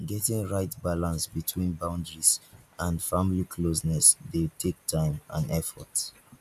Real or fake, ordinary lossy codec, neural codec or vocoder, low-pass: real; none; none; 14.4 kHz